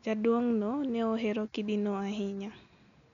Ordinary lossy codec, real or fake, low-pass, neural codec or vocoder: none; real; 7.2 kHz; none